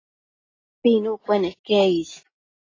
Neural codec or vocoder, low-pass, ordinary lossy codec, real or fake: none; 7.2 kHz; AAC, 32 kbps; real